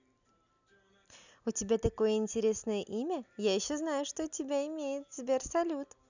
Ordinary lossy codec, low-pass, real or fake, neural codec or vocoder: none; 7.2 kHz; real; none